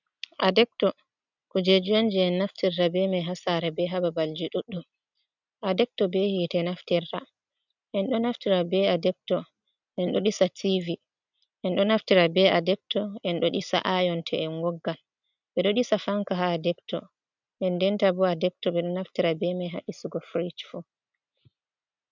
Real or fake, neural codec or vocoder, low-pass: real; none; 7.2 kHz